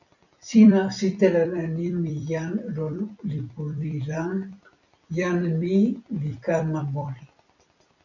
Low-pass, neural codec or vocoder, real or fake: 7.2 kHz; vocoder, 44.1 kHz, 128 mel bands every 256 samples, BigVGAN v2; fake